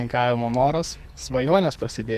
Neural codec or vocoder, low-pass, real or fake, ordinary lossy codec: codec, 44.1 kHz, 2.6 kbps, SNAC; 14.4 kHz; fake; Opus, 64 kbps